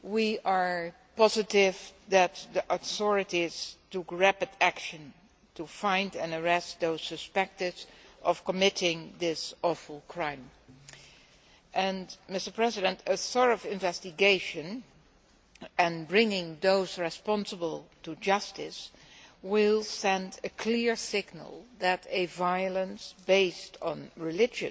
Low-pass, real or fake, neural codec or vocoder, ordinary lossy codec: none; real; none; none